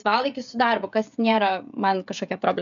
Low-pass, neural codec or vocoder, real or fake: 7.2 kHz; codec, 16 kHz, 16 kbps, FreqCodec, smaller model; fake